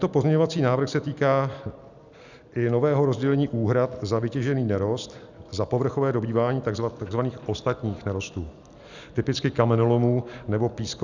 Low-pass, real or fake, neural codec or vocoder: 7.2 kHz; real; none